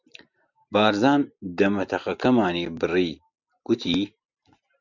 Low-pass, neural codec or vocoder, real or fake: 7.2 kHz; none; real